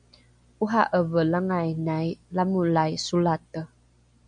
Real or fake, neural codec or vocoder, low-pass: real; none; 9.9 kHz